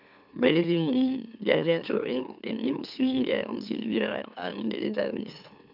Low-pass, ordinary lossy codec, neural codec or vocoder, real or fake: 5.4 kHz; none; autoencoder, 44.1 kHz, a latent of 192 numbers a frame, MeloTTS; fake